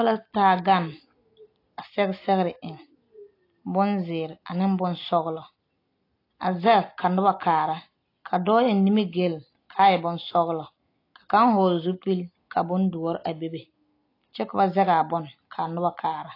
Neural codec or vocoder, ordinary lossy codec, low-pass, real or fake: none; AAC, 32 kbps; 5.4 kHz; real